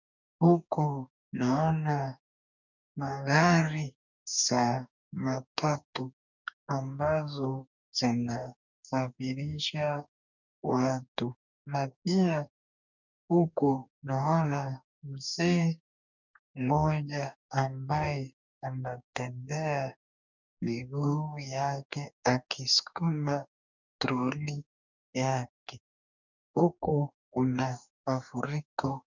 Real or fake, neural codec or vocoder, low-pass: fake; codec, 44.1 kHz, 2.6 kbps, DAC; 7.2 kHz